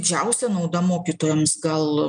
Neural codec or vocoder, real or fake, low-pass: none; real; 9.9 kHz